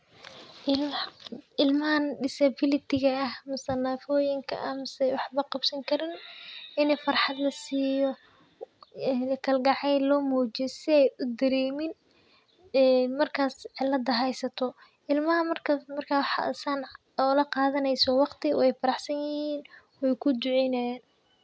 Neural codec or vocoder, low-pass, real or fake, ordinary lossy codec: none; none; real; none